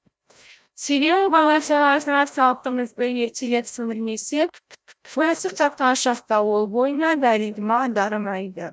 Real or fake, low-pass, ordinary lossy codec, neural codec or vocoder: fake; none; none; codec, 16 kHz, 0.5 kbps, FreqCodec, larger model